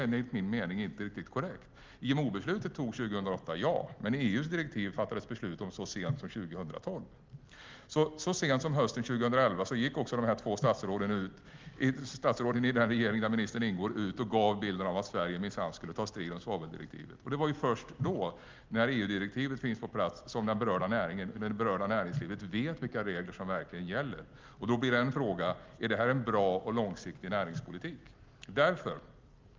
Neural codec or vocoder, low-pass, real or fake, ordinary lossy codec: none; 7.2 kHz; real; Opus, 32 kbps